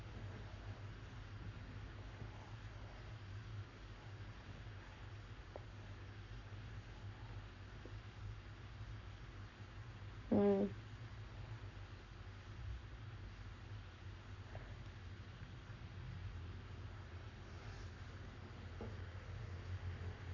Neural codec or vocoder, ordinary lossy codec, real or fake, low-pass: codec, 44.1 kHz, 7.8 kbps, Pupu-Codec; none; fake; 7.2 kHz